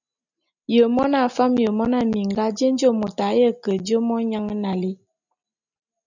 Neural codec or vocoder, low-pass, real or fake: none; 7.2 kHz; real